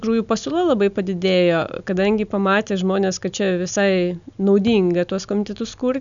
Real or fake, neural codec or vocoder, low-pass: real; none; 7.2 kHz